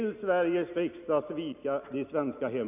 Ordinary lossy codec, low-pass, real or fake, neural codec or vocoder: none; 3.6 kHz; real; none